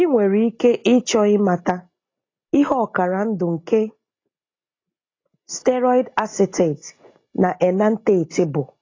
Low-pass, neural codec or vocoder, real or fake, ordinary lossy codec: 7.2 kHz; none; real; AAC, 32 kbps